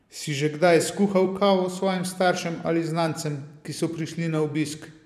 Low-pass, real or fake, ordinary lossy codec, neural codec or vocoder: 14.4 kHz; fake; none; vocoder, 44.1 kHz, 128 mel bands every 512 samples, BigVGAN v2